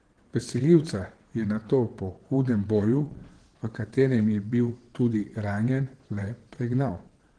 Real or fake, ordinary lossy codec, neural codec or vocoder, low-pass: fake; Opus, 16 kbps; vocoder, 22.05 kHz, 80 mel bands, WaveNeXt; 9.9 kHz